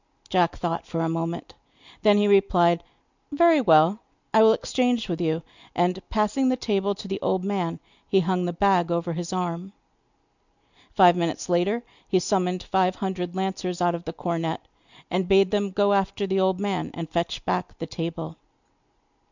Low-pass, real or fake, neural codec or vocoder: 7.2 kHz; real; none